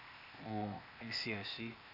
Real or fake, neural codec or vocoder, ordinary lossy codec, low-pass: fake; codec, 16 kHz, 0.8 kbps, ZipCodec; none; 5.4 kHz